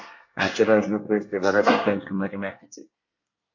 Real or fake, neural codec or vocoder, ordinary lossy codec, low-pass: fake; codec, 24 kHz, 1 kbps, SNAC; MP3, 48 kbps; 7.2 kHz